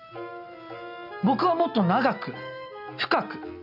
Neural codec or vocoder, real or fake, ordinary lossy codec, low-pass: none; real; AAC, 48 kbps; 5.4 kHz